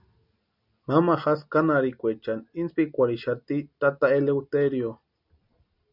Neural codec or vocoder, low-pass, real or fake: none; 5.4 kHz; real